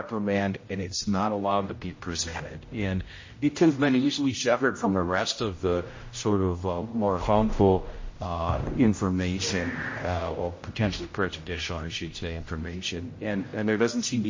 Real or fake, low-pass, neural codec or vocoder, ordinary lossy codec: fake; 7.2 kHz; codec, 16 kHz, 0.5 kbps, X-Codec, HuBERT features, trained on general audio; MP3, 32 kbps